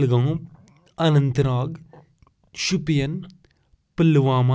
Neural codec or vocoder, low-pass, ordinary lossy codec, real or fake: none; none; none; real